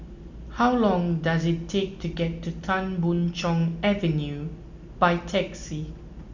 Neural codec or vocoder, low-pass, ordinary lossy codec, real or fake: none; 7.2 kHz; none; real